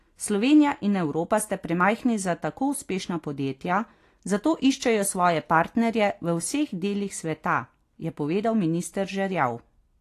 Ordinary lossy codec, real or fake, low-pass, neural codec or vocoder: AAC, 48 kbps; real; 14.4 kHz; none